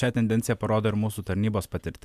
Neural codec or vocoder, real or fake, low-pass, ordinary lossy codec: none; real; 14.4 kHz; MP3, 96 kbps